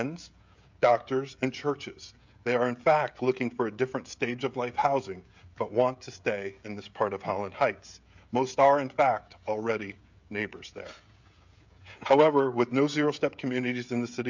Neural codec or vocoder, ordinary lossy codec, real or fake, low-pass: codec, 16 kHz, 16 kbps, FreqCodec, smaller model; MP3, 64 kbps; fake; 7.2 kHz